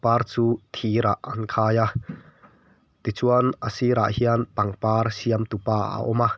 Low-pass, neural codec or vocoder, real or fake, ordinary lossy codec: none; none; real; none